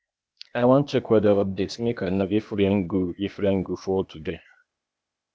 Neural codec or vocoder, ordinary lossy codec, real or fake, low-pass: codec, 16 kHz, 0.8 kbps, ZipCodec; none; fake; none